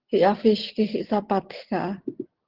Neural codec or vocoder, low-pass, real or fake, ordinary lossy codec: none; 5.4 kHz; real; Opus, 16 kbps